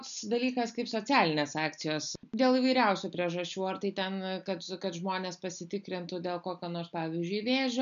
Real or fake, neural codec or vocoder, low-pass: real; none; 7.2 kHz